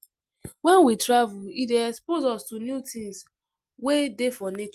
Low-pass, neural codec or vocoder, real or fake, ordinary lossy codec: 14.4 kHz; none; real; Opus, 32 kbps